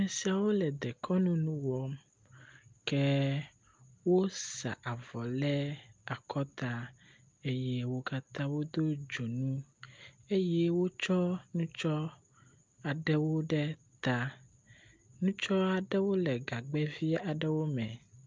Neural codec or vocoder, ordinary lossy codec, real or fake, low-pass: none; Opus, 24 kbps; real; 7.2 kHz